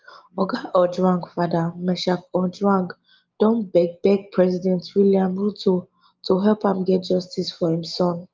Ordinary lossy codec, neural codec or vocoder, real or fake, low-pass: Opus, 32 kbps; none; real; 7.2 kHz